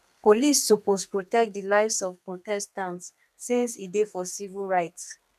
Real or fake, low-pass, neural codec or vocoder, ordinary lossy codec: fake; 14.4 kHz; codec, 32 kHz, 1.9 kbps, SNAC; none